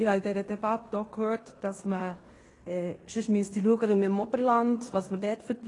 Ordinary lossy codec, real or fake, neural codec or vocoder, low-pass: AAC, 32 kbps; fake; codec, 16 kHz in and 24 kHz out, 0.9 kbps, LongCat-Audio-Codec, fine tuned four codebook decoder; 10.8 kHz